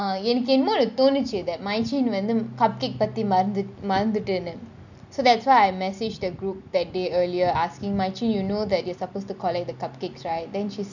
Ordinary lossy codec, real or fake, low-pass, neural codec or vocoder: none; real; 7.2 kHz; none